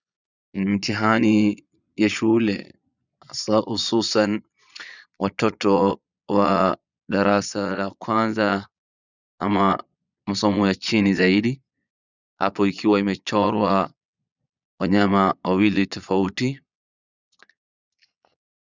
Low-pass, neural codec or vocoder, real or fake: 7.2 kHz; vocoder, 22.05 kHz, 80 mel bands, Vocos; fake